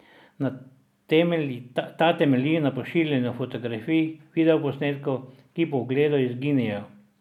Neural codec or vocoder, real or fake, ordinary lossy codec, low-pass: none; real; none; 19.8 kHz